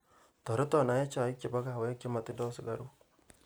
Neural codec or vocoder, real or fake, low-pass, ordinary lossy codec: none; real; none; none